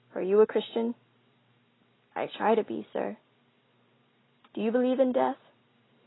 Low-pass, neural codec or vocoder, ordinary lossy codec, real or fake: 7.2 kHz; none; AAC, 16 kbps; real